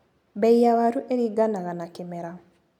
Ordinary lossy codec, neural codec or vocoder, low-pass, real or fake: none; none; 19.8 kHz; real